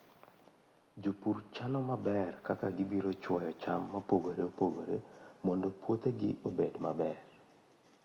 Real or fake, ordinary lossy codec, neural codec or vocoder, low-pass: real; Opus, 32 kbps; none; 19.8 kHz